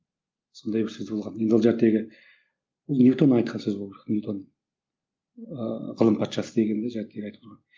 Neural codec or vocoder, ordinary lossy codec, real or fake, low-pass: none; Opus, 24 kbps; real; 7.2 kHz